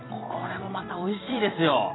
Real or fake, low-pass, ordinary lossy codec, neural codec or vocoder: fake; 7.2 kHz; AAC, 16 kbps; vocoder, 44.1 kHz, 128 mel bands every 256 samples, BigVGAN v2